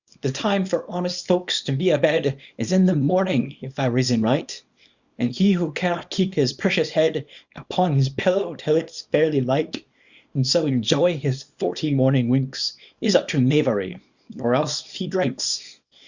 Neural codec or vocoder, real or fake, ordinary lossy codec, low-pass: codec, 24 kHz, 0.9 kbps, WavTokenizer, small release; fake; Opus, 64 kbps; 7.2 kHz